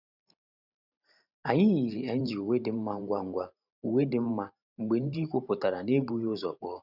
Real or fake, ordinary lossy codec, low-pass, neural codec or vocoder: fake; none; 5.4 kHz; vocoder, 44.1 kHz, 128 mel bands every 512 samples, BigVGAN v2